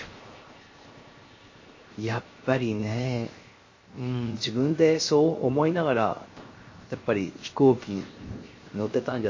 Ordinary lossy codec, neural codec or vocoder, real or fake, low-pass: MP3, 32 kbps; codec, 16 kHz, 0.7 kbps, FocalCodec; fake; 7.2 kHz